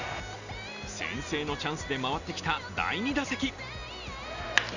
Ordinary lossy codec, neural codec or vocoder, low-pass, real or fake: none; none; 7.2 kHz; real